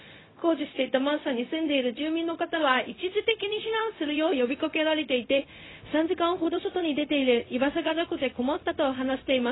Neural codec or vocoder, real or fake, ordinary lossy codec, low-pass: codec, 16 kHz, 0.4 kbps, LongCat-Audio-Codec; fake; AAC, 16 kbps; 7.2 kHz